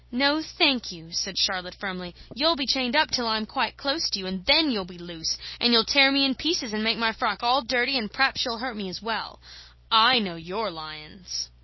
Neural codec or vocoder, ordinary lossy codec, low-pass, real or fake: none; MP3, 24 kbps; 7.2 kHz; real